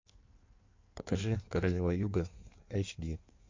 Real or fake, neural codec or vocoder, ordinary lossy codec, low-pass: fake; codec, 16 kHz in and 24 kHz out, 1.1 kbps, FireRedTTS-2 codec; MP3, 48 kbps; 7.2 kHz